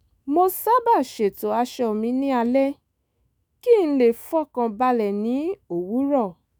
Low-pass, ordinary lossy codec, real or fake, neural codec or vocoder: none; none; fake; autoencoder, 48 kHz, 128 numbers a frame, DAC-VAE, trained on Japanese speech